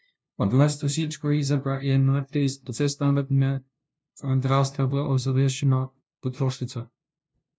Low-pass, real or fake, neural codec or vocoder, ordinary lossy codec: none; fake; codec, 16 kHz, 0.5 kbps, FunCodec, trained on LibriTTS, 25 frames a second; none